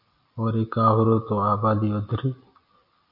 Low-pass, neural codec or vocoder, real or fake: 5.4 kHz; none; real